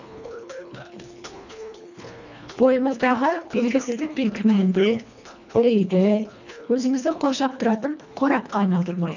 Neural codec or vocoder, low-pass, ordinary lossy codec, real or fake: codec, 24 kHz, 1.5 kbps, HILCodec; 7.2 kHz; none; fake